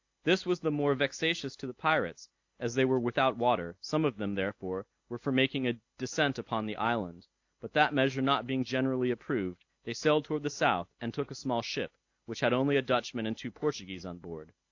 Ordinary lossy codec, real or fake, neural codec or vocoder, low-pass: AAC, 48 kbps; real; none; 7.2 kHz